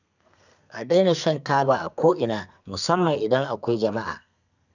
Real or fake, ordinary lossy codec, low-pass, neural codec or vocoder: fake; none; 7.2 kHz; codec, 32 kHz, 1.9 kbps, SNAC